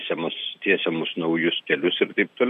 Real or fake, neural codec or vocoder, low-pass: real; none; 14.4 kHz